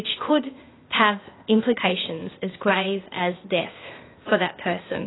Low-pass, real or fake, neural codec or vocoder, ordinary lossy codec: 7.2 kHz; fake; codec, 16 kHz, 0.8 kbps, ZipCodec; AAC, 16 kbps